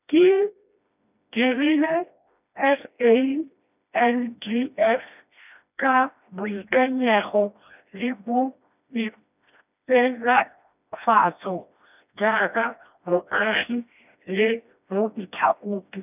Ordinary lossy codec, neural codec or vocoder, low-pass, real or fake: none; codec, 16 kHz, 1 kbps, FreqCodec, smaller model; 3.6 kHz; fake